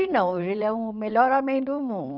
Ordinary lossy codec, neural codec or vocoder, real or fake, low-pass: none; vocoder, 22.05 kHz, 80 mel bands, WaveNeXt; fake; 5.4 kHz